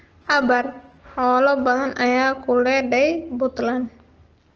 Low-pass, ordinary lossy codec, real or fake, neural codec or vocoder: 7.2 kHz; Opus, 16 kbps; real; none